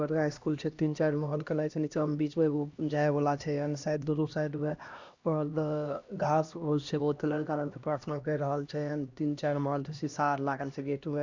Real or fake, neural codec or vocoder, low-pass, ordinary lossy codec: fake; codec, 16 kHz, 1 kbps, X-Codec, HuBERT features, trained on LibriSpeech; 7.2 kHz; Opus, 64 kbps